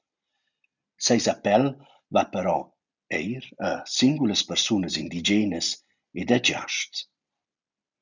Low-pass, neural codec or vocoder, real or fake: 7.2 kHz; none; real